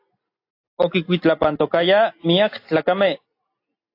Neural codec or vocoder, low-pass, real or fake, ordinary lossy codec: none; 5.4 kHz; real; AAC, 32 kbps